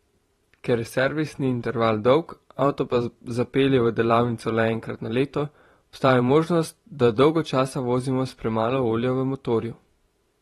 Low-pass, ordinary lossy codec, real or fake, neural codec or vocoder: 19.8 kHz; AAC, 32 kbps; fake; vocoder, 44.1 kHz, 128 mel bands every 512 samples, BigVGAN v2